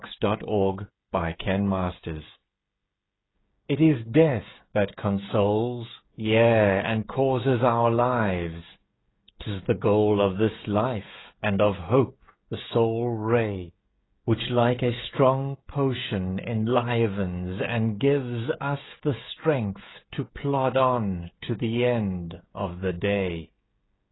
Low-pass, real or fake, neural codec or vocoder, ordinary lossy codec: 7.2 kHz; fake; codec, 16 kHz, 16 kbps, FreqCodec, smaller model; AAC, 16 kbps